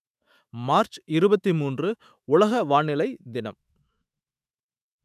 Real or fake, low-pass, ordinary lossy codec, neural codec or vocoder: fake; 14.4 kHz; none; autoencoder, 48 kHz, 128 numbers a frame, DAC-VAE, trained on Japanese speech